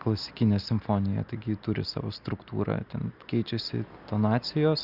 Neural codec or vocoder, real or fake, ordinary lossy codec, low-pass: none; real; Opus, 64 kbps; 5.4 kHz